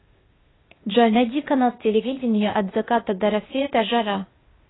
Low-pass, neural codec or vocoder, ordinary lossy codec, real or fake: 7.2 kHz; codec, 16 kHz, 0.8 kbps, ZipCodec; AAC, 16 kbps; fake